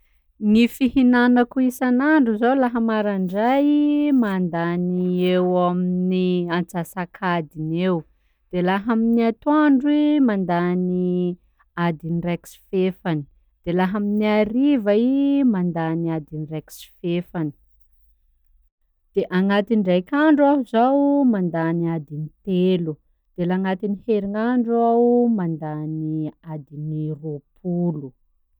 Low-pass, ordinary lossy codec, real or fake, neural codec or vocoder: 19.8 kHz; none; real; none